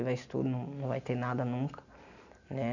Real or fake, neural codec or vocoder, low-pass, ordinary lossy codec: real; none; 7.2 kHz; none